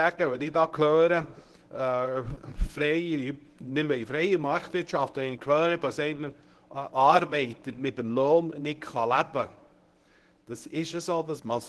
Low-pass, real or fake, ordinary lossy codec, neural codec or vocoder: 10.8 kHz; fake; Opus, 16 kbps; codec, 24 kHz, 0.9 kbps, WavTokenizer, medium speech release version 1